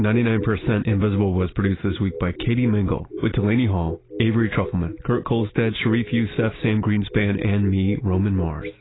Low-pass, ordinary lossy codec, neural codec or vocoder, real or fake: 7.2 kHz; AAC, 16 kbps; none; real